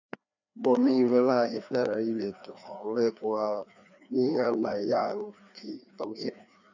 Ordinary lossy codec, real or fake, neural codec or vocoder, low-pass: none; fake; codec, 16 kHz, 2 kbps, FreqCodec, larger model; 7.2 kHz